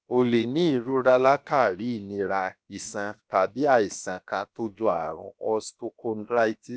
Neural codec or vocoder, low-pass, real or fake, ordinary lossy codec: codec, 16 kHz, about 1 kbps, DyCAST, with the encoder's durations; none; fake; none